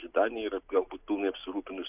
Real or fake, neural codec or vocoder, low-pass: real; none; 3.6 kHz